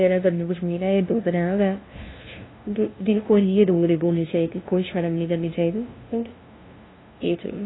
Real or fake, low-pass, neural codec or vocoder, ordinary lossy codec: fake; 7.2 kHz; codec, 16 kHz, 0.5 kbps, FunCodec, trained on LibriTTS, 25 frames a second; AAC, 16 kbps